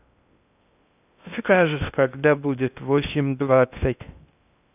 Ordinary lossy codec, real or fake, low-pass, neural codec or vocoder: none; fake; 3.6 kHz; codec, 16 kHz in and 24 kHz out, 0.6 kbps, FocalCodec, streaming, 2048 codes